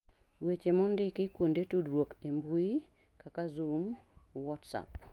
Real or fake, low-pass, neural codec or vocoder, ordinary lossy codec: fake; 19.8 kHz; autoencoder, 48 kHz, 128 numbers a frame, DAC-VAE, trained on Japanese speech; Opus, 32 kbps